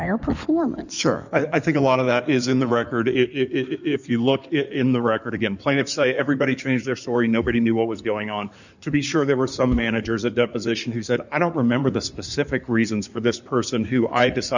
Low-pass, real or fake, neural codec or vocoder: 7.2 kHz; fake; codec, 16 kHz in and 24 kHz out, 2.2 kbps, FireRedTTS-2 codec